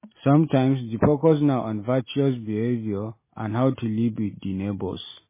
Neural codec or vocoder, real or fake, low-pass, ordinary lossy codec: none; real; 3.6 kHz; MP3, 16 kbps